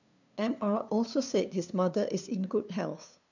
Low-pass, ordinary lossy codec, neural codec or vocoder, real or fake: 7.2 kHz; none; codec, 16 kHz, 2 kbps, FunCodec, trained on LibriTTS, 25 frames a second; fake